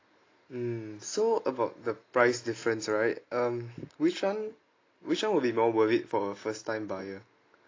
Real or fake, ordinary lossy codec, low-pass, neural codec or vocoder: real; AAC, 32 kbps; 7.2 kHz; none